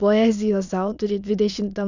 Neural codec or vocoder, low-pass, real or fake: autoencoder, 22.05 kHz, a latent of 192 numbers a frame, VITS, trained on many speakers; 7.2 kHz; fake